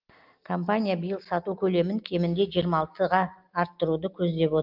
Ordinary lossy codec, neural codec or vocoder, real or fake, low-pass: Opus, 32 kbps; none; real; 5.4 kHz